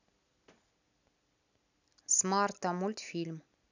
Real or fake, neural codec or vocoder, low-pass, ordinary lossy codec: real; none; 7.2 kHz; none